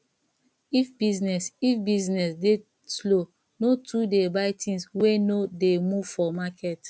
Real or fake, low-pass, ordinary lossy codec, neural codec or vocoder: real; none; none; none